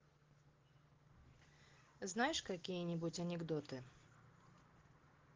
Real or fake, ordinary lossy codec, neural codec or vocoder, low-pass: real; Opus, 16 kbps; none; 7.2 kHz